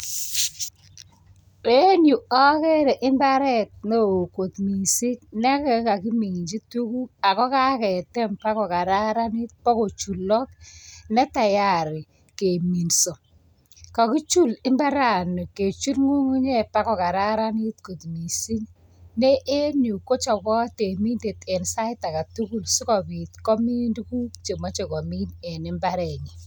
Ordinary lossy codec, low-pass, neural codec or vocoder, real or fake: none; none; none; real